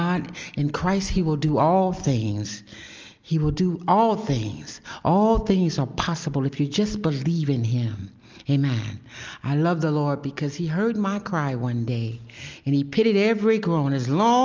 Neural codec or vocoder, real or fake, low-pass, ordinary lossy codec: none; real; 7.2 kHz; Opus, 24 kbps